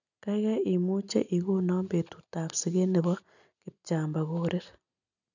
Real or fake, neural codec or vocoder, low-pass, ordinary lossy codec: real; none; 7.2 kHz; none